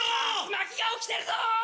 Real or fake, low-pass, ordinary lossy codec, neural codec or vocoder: real; none; none; none